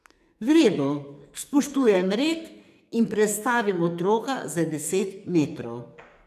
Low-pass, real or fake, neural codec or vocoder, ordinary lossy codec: 14.4 kHz; fake; codec, 44.1 kHz, 2.6 kbps, SNAC; none